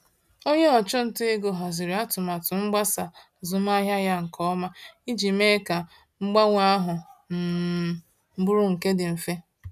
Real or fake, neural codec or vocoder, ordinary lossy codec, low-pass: real; none; none; 14.4 kHz